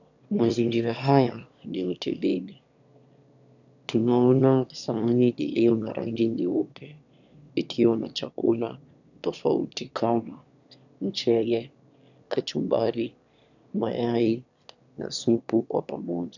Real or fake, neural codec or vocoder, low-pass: fake; autoencoder, 22.05 kHz, a latent of 192 numbers a frame, VITS, trained on one speaker; 7.2 kHz